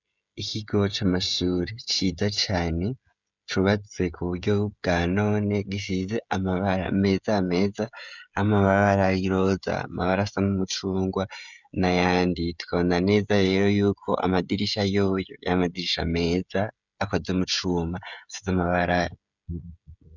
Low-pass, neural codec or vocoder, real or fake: 7.2 kHz; codec, 16 kHz, 16 kbps, FreqCodec, smaller model; fake